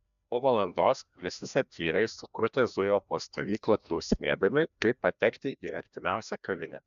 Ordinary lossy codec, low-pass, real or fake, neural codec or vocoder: AAC, 96 kbps; 7.2 kHz; fake; codec, 16 kHz, 1 kbps, FreqCodec, larger model